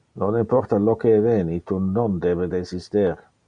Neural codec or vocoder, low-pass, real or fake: none; 9.9 kHz; real